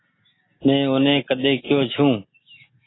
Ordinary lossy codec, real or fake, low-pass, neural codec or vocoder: AAC, 16 kbps; real; 7.2 kHz; none